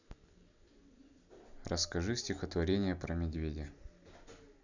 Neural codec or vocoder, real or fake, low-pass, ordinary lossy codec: none; real; 7.2 kHz; none